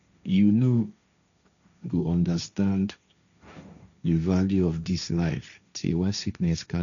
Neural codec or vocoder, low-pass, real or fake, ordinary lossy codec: codec, 16 kHz, 1.1 kbps, Voila-Tokenizer; 7.2 kHz; fake; none